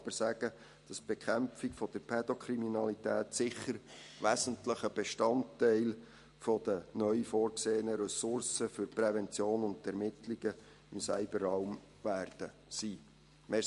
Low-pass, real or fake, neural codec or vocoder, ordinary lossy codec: 14.4 kHz; real; none; MP3, 48 kbps